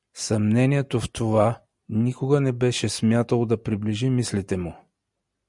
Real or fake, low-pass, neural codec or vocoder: real; 10.8 kHz; none